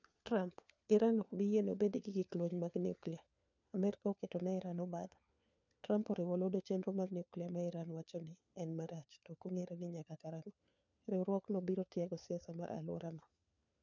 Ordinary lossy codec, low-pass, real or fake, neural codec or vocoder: none; 7.2 kHz; fake; codec, 16 kHz, 4 kbps, FunCodec, trained on LibriTTS, 50 frames a second